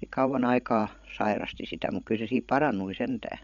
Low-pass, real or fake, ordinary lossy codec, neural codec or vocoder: 7.2 kHz; fake; none; codec, 16 kHz, 16 kbps, FreqCodec, larger model